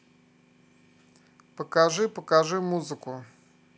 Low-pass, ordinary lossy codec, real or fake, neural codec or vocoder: none; none; real; none